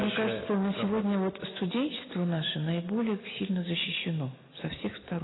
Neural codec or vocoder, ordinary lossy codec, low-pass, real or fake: none; AAC, 16 kbps; 7.2 kHz; real